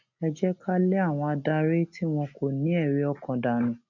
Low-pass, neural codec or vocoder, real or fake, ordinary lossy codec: 7.2 kHz; none; real; none